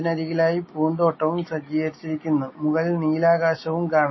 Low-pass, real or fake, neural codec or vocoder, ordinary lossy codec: 7.2 kHz; real; none; MP3, 24 kbps